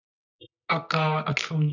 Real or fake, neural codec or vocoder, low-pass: fake; codec, 24 kHz, 0.9 kbps, WavTokenizer, medium music audio release; 7.2 kHz